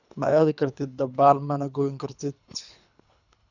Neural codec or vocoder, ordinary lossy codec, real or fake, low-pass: codec, 24 kHz, 3 kbps, HILCodec; none; fake; 7.2 kHz